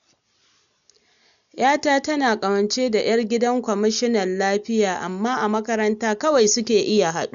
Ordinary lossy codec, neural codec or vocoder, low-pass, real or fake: none; none; 7.2 kHz; real